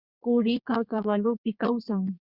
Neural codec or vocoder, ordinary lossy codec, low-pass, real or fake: codec, 32 kHz, 1.9 kbps, SNAC; Opus, 64 kbps; 5.4 kHz; fake